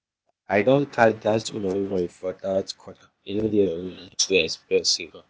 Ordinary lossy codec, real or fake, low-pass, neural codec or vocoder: none; fake; none; codec, 16 kHz, 0.8 kbps, ZipCodec